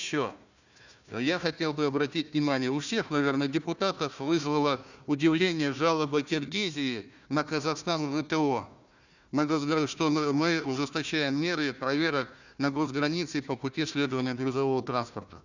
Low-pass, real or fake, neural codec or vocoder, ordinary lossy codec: 7.2 kHz; fake; codec, 16 kHz, 1 kbps, FunCodec, trained on Chinese and English, 50 frames a second; none